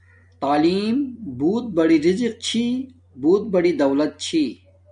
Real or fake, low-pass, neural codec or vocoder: real; 9.9 kHz; none